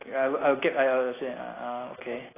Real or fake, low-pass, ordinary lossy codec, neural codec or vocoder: real; 3.6 kHz; AAC, 16 kbps; none